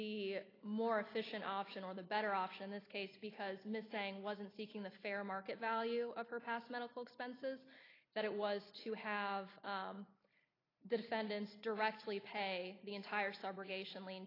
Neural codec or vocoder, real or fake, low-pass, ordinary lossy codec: none; real; 5.4 kHz; AAC, 24 kbps